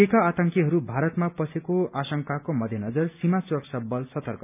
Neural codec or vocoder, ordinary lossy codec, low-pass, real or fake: none; none; 3.6 kHz; real